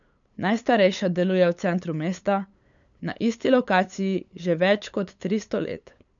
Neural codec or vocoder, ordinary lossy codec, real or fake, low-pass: codec, 16 kHz, 8 kbps, FunCodec, trained on LibriTTS, 25 frames a second; none; fake; 7.2 kHz